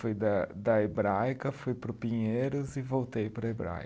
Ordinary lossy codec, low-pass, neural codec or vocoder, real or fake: none; none; none; real